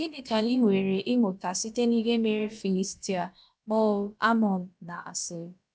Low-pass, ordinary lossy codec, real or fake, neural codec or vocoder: none; none; fake; codec, 16 kHz, about 1 kbps, DyCAST, with the encoder's durations